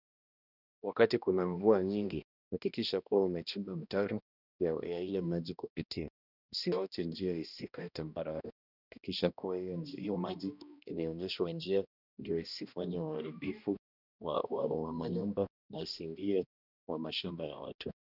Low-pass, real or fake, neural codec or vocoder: 5.4 kHz; fake; codec, 16 kHz, 1 kbps, X-Codec, HuBERT features, trained on balanced general audio